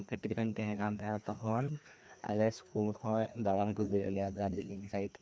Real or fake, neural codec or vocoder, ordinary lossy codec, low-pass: fake; codec, 16 kHz, 2 kbps, FreqCodec, larger model; none; none